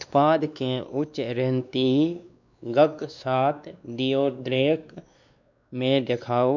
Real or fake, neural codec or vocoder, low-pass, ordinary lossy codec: fake; codec, 16 kHz, 2 kbps, X-Codec, WavLM features, trained on Multilingual LibriSpeech; 7.2 kHz; none